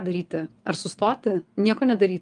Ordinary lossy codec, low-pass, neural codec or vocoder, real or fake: Opus, 32 kbps; 9.9 kHz; vocoder, 22.05 kHz, 80 mel bands, WaveNeXt; fake